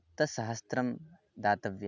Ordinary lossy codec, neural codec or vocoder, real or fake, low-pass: none; none; real; 7.2 kHz